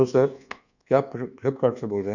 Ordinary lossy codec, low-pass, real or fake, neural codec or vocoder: none; 7.2 kHz; fake; codec, 24 kHz, 1.2 kbps, DualCodec